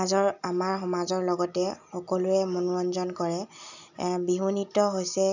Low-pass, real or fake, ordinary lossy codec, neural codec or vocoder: 7.2 kHz; real; none; none